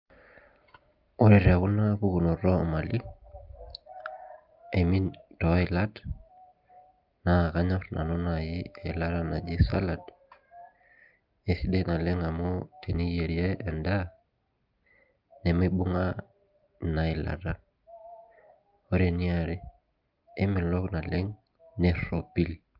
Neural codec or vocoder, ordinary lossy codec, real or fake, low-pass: none; Opus, 32 kbps; real; 5.4 kHz